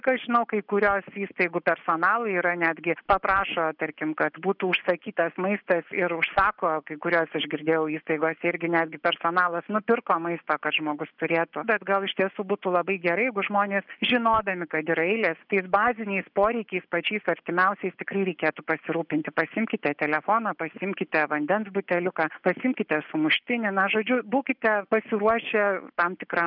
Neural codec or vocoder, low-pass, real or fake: none; 5.4 kHz; real